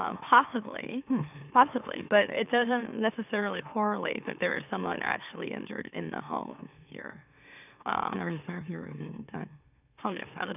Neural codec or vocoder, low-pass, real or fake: autoencoder, 44.1 kHz, a latent of 192 numbers a frame, MeloTTS; 3.6 kHz; fake